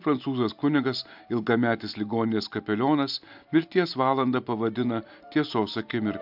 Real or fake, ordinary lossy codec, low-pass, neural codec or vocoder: real; AAC, 48 kbps; 5.4 kHz; none